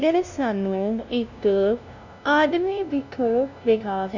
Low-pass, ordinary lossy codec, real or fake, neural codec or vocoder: 7.2 kHz; none; fake; codec, 16 kHz, 0.5 kbps, FunCodec, trained on LibriTTS, 25 frames a second